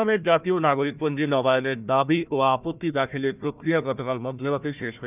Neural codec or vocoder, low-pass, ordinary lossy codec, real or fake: codec, 16 kHz, 1 kbps, FunCodec, trained on Chinese and English, 50 frames a second; 3.6 kHz; none; fake